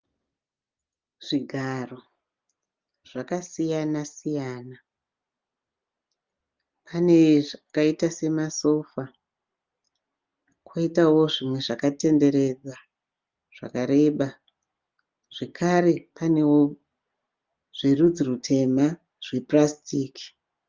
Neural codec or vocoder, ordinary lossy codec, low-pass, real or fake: none; Opus, 32 kbps; 7.2 kHz; real